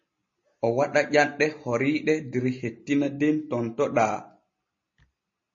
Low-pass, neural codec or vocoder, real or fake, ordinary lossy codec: 7.2 kHz; none; real; MP3, 32 kbps